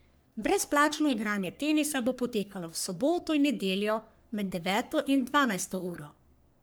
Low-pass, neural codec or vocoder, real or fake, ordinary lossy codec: none; codec, 44.1 kHz, 3.4 kbps, Pupu-Codec; fake; none